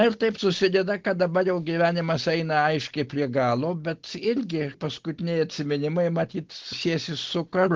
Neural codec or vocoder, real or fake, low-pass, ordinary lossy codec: none; real; 7.2 kHz; Opus, 16 kbps